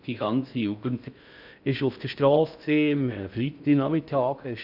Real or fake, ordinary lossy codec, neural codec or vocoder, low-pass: fake; none; codec, 16 kHz in and 24 kHz out, 0.6 kbps, FocalCodec, streaming, 4096 codes; 5.4 kHz